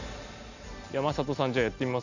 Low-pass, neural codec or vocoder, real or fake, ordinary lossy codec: 7.2 kHz; none; real; none